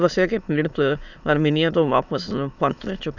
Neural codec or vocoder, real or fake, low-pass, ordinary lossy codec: autoencoder, 22.05 kHz, a latent of 192 numbers a frame, VITS, trained on many speakers; fake; 7.2 kHz; none